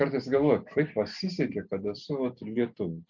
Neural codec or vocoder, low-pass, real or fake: none; 7.2 kHz; real